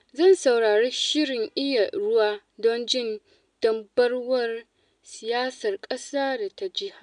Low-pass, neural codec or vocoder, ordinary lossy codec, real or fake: 9.9 kHz; none; MP3, 96 kbps; real